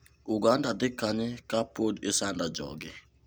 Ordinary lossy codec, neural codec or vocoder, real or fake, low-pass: none; none; real; none